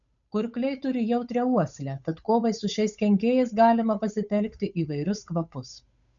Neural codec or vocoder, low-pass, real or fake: codec, 16 kHz, 8 kbps, FunCodec, trained on Chinese and English, 25 frames a second; 7.2 kHz; fake